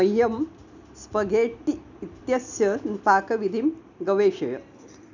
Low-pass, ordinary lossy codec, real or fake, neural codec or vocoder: 7.2 kHz; none; real; none